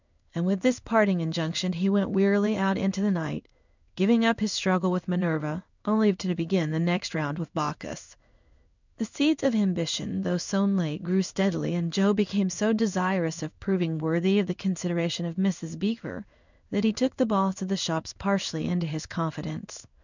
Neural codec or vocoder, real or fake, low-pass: codec, 16 kHz in and 24 kHz out, 1 kbps, XY-Tokenizer; fake; 7.2 kHz